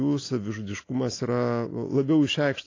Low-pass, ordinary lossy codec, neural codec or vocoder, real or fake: 7.2 kHz; AAC, 32 kbps; none; real